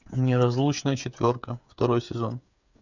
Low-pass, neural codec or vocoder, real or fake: 7.2 kHz; codec, 16 kHz, 8 kbps, FreqCodec, smaller model; fake